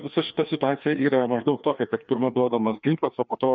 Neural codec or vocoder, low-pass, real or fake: codec, 16 kHz, 2 kbps, FreqCodec, larger model; 7.2 kHz; fake